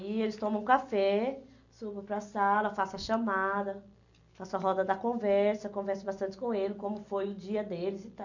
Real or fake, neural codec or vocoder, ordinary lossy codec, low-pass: real; none; none; 7.2 kHz